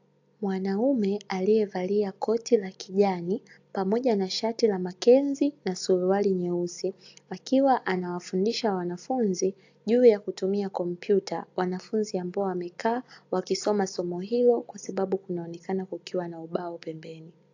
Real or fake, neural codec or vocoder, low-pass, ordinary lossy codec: real; none; 7.2 kHz; AAC, 48 kbps